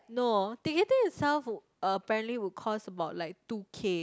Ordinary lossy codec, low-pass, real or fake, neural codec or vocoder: none; none; real; none